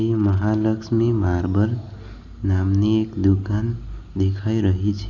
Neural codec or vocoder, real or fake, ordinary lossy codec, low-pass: none; real; none; 7.2 kHz